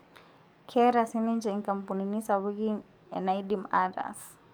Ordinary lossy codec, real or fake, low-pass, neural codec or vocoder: none; fake; none; codec, 44.1 kHz, 7.8 kbps, DAC